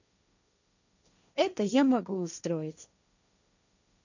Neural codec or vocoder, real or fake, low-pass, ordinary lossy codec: codec, 16 kHz, 1.1 kbps, Voila-Tokenizer; fake; 7.2 kHz; none